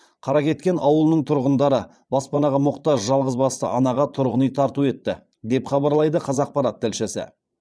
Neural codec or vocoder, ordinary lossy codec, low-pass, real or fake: vocoder, 22.05 kHz, 80 mel bands, Vocos; none; none; fake